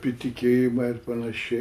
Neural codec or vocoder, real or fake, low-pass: none; real; 14.4 kHz